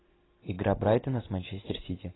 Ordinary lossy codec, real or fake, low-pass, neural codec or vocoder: AAC, 16 kbps; real; 7.2 kHz; none